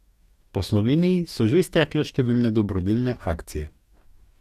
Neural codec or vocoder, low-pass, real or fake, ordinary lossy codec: codec, 44.1 kHz, 2.6 kbps, DAC; 14.4 kHz; fake; none